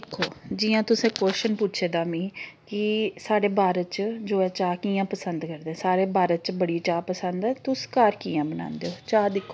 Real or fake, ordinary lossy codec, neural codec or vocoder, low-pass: real; none; none; none